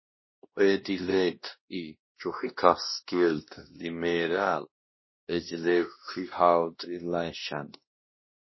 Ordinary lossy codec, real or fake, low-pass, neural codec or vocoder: MP3, 24 kbps; fake; 7.2 kHz; codec, 16 kHz, 1 kbps, X-Codec, WavLM features, trained on Multilingual LibriSpeech